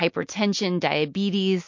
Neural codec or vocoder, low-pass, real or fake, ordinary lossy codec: none; 7.2 kHz; real; MP3, 48 kbps